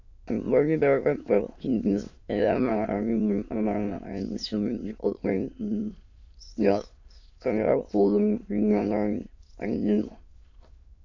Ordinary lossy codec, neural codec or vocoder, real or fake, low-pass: AAC, 48 kbps; autoencoder, 22.05 kHz, a latent of 192 numbers a frame, VITS, trained on many speakers; fake; 7.2 kHz